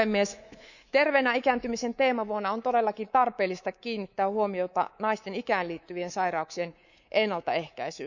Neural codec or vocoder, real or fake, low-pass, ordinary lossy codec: codec, 24 kHz, 3.1 kbps, DualCodec; fake; 7.2 kHz; none